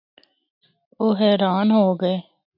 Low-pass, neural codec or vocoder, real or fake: 5.4 kHz; none; real